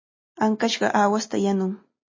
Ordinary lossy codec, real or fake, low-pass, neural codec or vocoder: MP3, 32 kbps; real; 7.2 kHz; none